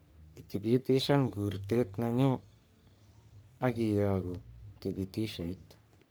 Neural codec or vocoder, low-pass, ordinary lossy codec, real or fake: codec, 44.1 kHz, 3.4 kbps, Pupu-Codec; none; none; fake